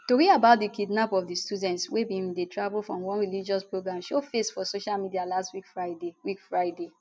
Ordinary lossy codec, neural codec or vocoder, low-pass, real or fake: none; none; none; real